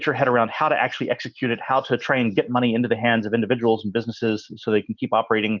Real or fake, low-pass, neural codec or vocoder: real; 7.2 kHz; none